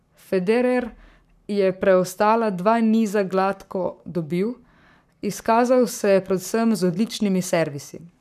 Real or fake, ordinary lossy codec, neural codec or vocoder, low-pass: fake; none; codec, 44.1 kHz, 7.8 kbps, Pupu-Codec; 14.4 kHz